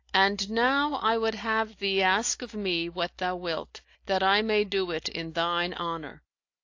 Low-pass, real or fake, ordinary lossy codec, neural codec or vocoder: 7.2 kHz; real; AAC, 48 kbps; none